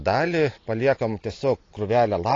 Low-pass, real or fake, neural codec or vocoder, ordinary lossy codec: 7.2 kHz; real; none; AAC, 32 kbps